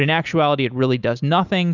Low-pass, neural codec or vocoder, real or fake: 7.2 kHz; none; real